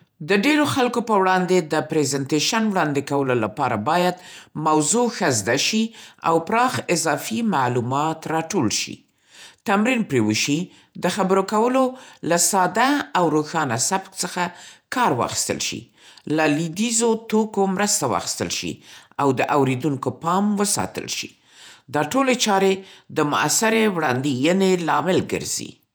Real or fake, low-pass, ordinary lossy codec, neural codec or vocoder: fake; none; none; vocoder, 48 kHz, 128 mel bands, Vocos